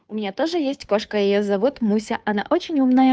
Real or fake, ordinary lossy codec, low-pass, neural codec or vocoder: fake; none; none; codec, 16 kHz, 2 kbps, FunCodec, trained on Chinese and English, 25 frames a second